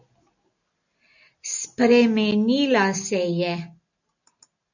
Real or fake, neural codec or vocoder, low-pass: real; none; 7.2 kHz